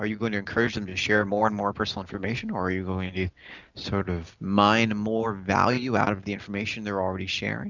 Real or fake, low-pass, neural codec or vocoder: real; 7.2 kHz; none